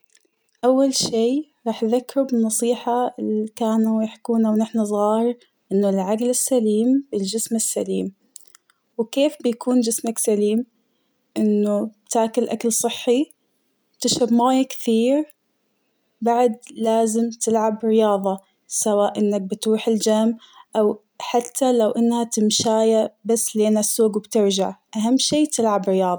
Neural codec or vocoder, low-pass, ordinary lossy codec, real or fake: none; none; none; real